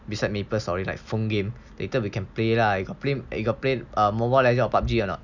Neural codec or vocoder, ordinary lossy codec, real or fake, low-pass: none; none; real; 7.2 kHz